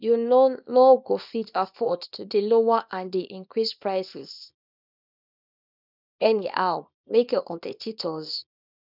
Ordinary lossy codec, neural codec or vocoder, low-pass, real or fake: none; codec, 24 kHz, 0.9 kbps, WavTokenizer, small release; 5.4 kHz; fake